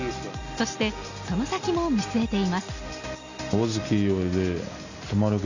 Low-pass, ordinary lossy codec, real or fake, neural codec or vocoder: 7.2 kHz; none; real; none